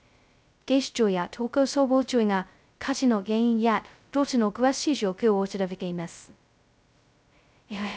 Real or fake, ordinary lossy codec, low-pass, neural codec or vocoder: fake; none; none; codec, 16 kHz, 0.2 kbps, FocalCodec